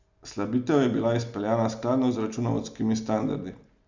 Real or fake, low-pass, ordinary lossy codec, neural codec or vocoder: real; 7.2 kHz; none; none